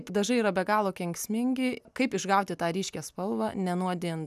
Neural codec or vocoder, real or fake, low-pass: none; real; 14.4 kHz